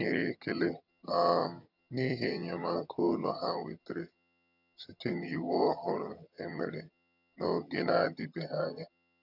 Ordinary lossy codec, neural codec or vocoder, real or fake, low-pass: none; vocoder, 22.05 kHz, 80 mel bands, HiFi-GAN; fake; 5.4 kHz